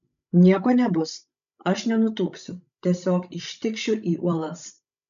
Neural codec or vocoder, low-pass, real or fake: codec, 16 kHz, 16 kbps, FreqCodec, larger model; 7.2 kHz; fake